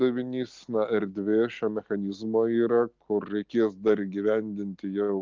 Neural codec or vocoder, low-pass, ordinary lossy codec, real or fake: none; 7.2 kHz; Opus, 32 kbps; real